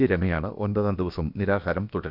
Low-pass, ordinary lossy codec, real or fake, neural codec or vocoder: 5.4 kHz; none; fake; codec, 16 kHz, about 1 kbps, DyCAST, with the encoder's durations